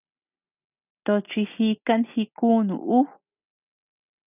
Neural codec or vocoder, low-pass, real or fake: none; 3.6 kHz; real